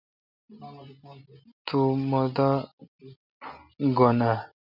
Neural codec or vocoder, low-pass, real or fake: none; 5.4 kHz; real